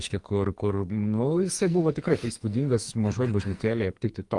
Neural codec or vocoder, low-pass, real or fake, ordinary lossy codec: codec, 44.1 kHz, 2.6 kbps, SNAC; 10.8 kHz; fake; Opus, 32 kbps